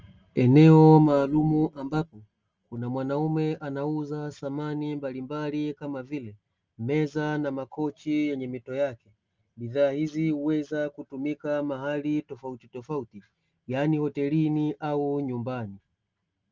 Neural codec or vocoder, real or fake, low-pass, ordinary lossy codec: none; real; 7.2 kHz; Opus, 24 kbps